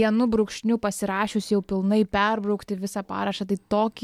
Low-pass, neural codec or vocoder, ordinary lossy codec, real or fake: 19.8 kHz; none; MP3, 96 kbps; real